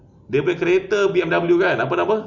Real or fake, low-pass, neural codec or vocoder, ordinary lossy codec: real; 7.2 kHz; none; none